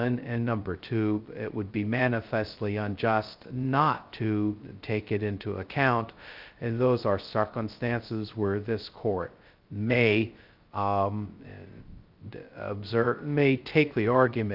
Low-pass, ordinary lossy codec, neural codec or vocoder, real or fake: 5.4 kHz; Opus, 24 kbps; codec, 16 kHz, 0.2 kbps, FocalCodec; fake